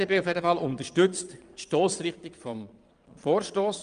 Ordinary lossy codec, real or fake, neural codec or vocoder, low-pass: Opus, 32 kbps; fake; vocoder, 22.05 kHz, 80 mel bands, Vocos; 9.9 kHz